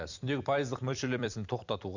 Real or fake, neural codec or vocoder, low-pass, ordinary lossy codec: fake; vocoder, 44.1 kHz, 128 mel bands every 256 samples, BigVGAN v2; 7.2 kHz; none